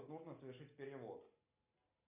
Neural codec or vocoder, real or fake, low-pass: none; real; 3.6 kHz